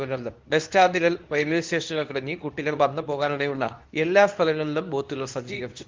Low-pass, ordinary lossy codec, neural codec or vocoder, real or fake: 7.2 kHz; Opus, 24 kbps; codec, 24 kHz, 0.9 kbps, WavTokenizer, medium speech release version 2; fake